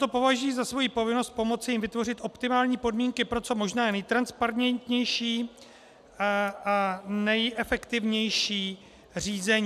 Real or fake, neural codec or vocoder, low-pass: real; none; 14.4 kHz